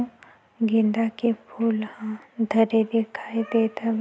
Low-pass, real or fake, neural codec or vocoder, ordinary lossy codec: none; real; none; none